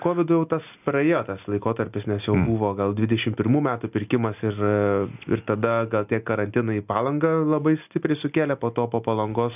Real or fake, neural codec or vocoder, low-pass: real; none; 3.6 kHz